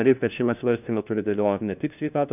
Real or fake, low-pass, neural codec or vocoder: fake; 3.6 kHz; codec, 16 kHz, 1 kbps, FunCodec, trained on LibriTTS, 50 frames a second